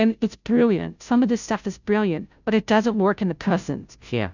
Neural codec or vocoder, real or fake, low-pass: codec, 16 kHz, 0.5 kbps, FunCodec, trained on Chinese and English, 25 frames a second; fake; 7.2 kHz